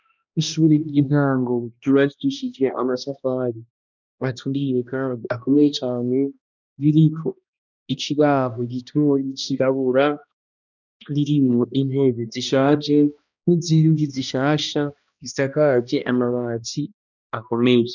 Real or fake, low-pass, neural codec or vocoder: fake; 7.2 kHz; codec, 16 kHz, 1 kbps, X-Codec, HuBERT features, trained on balanced general audio